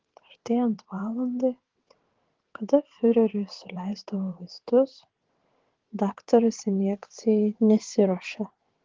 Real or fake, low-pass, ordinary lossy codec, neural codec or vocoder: fake; 7.2 kHz; Opus, 16 kbps; vocoder, 22.05 kHz, 80 mel bands, WaveNeXt